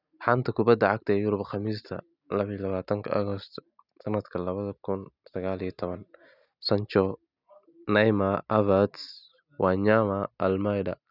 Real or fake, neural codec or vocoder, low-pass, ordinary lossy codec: real; none; 5.4 kHz; none